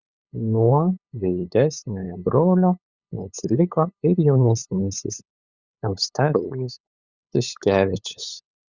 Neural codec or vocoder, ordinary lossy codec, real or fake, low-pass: codec, 16 kHz, 8 kbps, FunCodec, trained on LibriTTS, 25 frames a second; Opus, 64 kbps; fake; 7.2 kHz